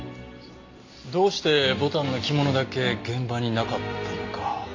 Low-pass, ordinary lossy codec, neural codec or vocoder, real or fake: 7.2 kHz; MP3, 48 kbps; none; real